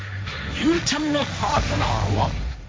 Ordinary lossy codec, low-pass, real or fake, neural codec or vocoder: none; none; fake; codec, 16 kHz, 1.1 kbps, Voila-Tokenizer